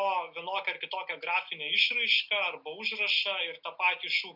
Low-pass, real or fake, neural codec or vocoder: 5.4 kHz; real; none